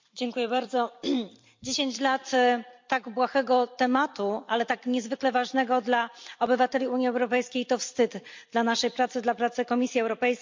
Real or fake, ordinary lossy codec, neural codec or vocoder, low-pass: fake; MP3, 64 kbps; vocoder, 44.1 kHz, 128 mel bands every 256 samples, BigVGAN v2; 7.2 kHz